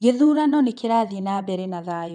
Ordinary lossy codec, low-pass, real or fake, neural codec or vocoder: none; 9.9 kHz; fake; vocoder, 22.05 kHz, 80 mel bands, WaveNeXt